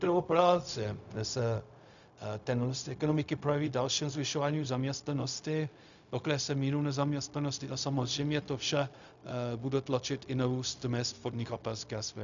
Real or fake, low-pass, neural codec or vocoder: fake; 7.2 kHz; codec, 16 kHz, 0.4 kbps, LongCat-Audio-Codec